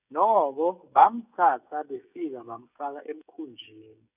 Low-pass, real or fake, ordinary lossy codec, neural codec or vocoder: 3.6 kHz; fake; none; codec, 16 kHz, 16 kbps, FreqCodec, smaller model